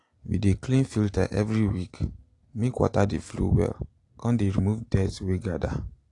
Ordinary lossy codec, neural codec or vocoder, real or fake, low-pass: AAC, 48 kbps; none; real; 10.8 kHz